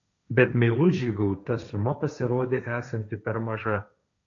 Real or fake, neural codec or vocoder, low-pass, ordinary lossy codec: fake; codec, 16 kHz, 1.1 kbps, Voila-Tokenizer; 7.2 kHz; AAC, 64 kbps